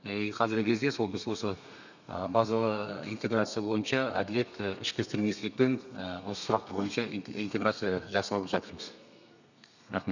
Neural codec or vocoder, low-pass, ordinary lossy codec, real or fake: codec, 32 kHz, 1.9 kbps, SNAC; 7.2 kHz; none; fake